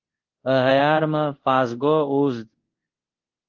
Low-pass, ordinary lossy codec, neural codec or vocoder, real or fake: 7.2 kHz; Opus, 16 kbps; codec, 24 kHz, 0.5 kbps, DualCodec; fake